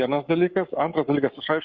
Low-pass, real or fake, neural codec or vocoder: 7.2 kHz; fake; codec, 44.1 kHz, 7.8 kbps, Pupu-Codec